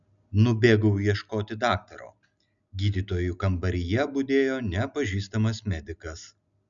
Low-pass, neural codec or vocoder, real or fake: 7.2 kHz; none; real